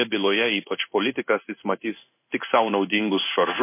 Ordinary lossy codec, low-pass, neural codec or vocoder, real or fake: MP3, 24 kbps; 3.6 kHz; codec, 16 kHz in and 24 kHz out, 1 kbps, XY-Tokenizer; fake